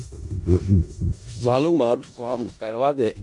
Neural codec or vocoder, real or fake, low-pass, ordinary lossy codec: codec, 16 kHz in and 24 kHz out, 0.4 kbps, LongCat-Audio-Codec, four codebook decoder; fake; 10.8 kHz; MP3, 64 kbps